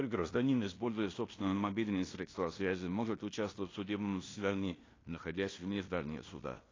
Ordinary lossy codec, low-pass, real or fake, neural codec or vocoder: AAC, 32 kbps; 7.2 kHz; fake; codec, 16 kHz in and 24 kHz out, 0.9 kbps, LongCat-Audio-Codec, fine tuned four codebook decoder